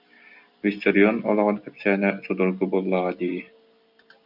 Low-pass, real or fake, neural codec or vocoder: 5.4 kHz; real; none